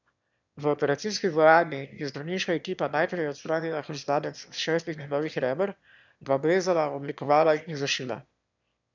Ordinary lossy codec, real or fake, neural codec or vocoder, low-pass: none; fake; autoencoder, 22.05 kHz, a latent of 192 numbers a frame, VITS, trained on one speaker; 7.2 kHz